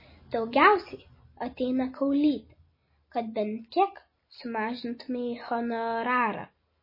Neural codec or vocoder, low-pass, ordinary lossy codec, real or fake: none; 5.4 kHz; MP3, 24 kbps; real